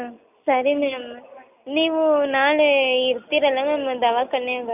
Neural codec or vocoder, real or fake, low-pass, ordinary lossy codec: none; real; 3.6 kHz; none